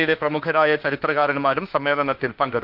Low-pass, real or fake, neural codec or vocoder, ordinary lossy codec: 5.4 kHz; fake; autoencoder, 48 kHz, 32 numbers a frame, DAC-VAE, trained on Japanese speech; Opus, 32 kbps